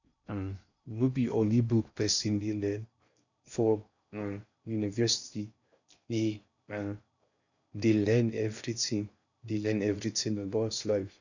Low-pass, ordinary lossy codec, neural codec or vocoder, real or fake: 7.2 kHz; none; codec, 16 kHz in and 24 kHz out, 0.6 kbps, FocalCodec, streaming, 2048 codes; fake